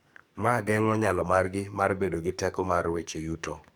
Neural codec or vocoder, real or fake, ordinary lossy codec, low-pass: codec, 44.1 kHz, 2.6 kbps, SNAC; fake; none; none